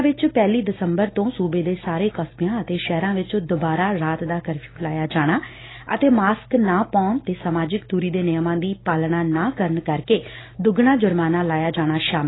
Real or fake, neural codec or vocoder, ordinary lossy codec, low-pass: real; none; AAC, 16 kbps; 7.2 kHz